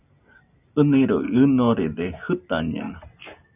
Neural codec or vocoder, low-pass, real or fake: none; 3.6 kHz; real